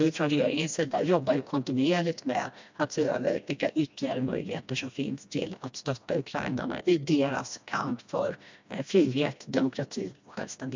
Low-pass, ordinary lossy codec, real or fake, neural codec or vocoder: 7.2 kHz; MP3, 64 kbps; fake; codec, 16 kHz, 1 kbps, FreqCodec, smaller model